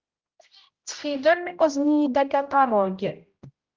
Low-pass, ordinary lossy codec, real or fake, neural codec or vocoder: 7.2 kHz; Opus, 32 kbps; fake; codec, 16 kHz, 0.5 kbps, X-Codec, HuBERT features, trained on general audio